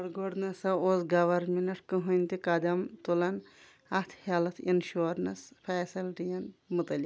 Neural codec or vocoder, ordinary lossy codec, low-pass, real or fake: none; none; none; real